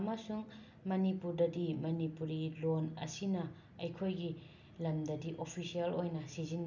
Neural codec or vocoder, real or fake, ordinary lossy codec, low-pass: none; real; none; 7.2 kHz